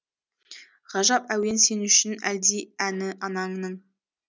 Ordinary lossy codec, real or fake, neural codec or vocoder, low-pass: none; real; none; none